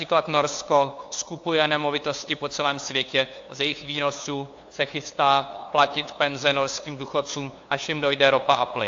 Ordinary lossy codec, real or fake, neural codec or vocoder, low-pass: AAC, 48 kbps; fake; codec, 16 kHz, 2 kbps, FunCodec, trained on LibriTTS, 25 frames a second; 7.2 kHz